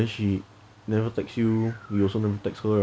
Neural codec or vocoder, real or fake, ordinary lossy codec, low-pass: none; real; none; none